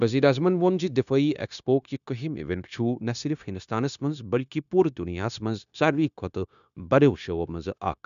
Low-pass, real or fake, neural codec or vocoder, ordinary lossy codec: 7.2 kHz; fake; codec, 16 kHz, 0.9 kbps, LongCat-Audio-Codec; none